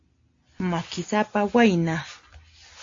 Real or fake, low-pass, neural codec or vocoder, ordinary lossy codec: real; 7.2 kHz; none; AAC, 48 kbps